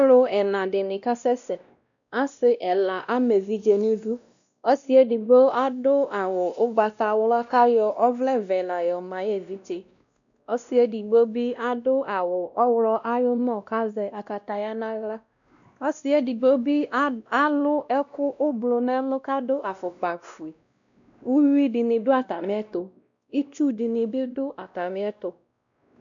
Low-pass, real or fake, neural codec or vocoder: 7.2 kHz; fake; codec, 16 kHz, 1 kbps, X-Codec, WavLM features, trained on Multilingual LibriSpeech